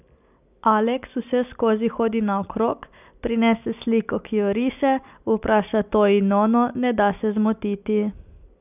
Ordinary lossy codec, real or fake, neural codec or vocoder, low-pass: none; real; none; 3.6 kHz